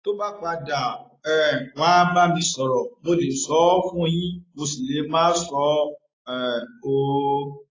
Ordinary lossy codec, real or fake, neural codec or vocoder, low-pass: AAC, 32 kbps; real; none; 7.2 kHz